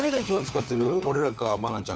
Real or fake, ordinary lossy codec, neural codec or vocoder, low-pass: fake; none; codec, 16 kHz, 4 kbps, FunCodec, trained on LibriTTS, 50 frames a second; none